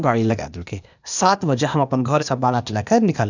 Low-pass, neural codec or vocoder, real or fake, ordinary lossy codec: 7.2 kHz; codec, 16 kHz, 0.8 kbps, ZipCodec; fake; none